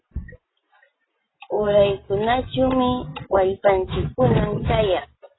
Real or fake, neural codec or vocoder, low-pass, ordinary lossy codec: real; none; 7.2 kHz; AAC, 16 kbps